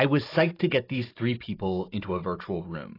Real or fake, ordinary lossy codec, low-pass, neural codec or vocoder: real; AAC, 24 kbps; 5.4 kHz; none